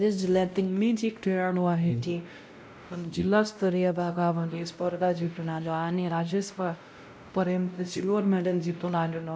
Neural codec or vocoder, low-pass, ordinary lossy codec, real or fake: codec, 16 kHz, 0.5 kbps, X-Codec, WavLM features, trained on Multilingual LibriSpeech; none; none; fake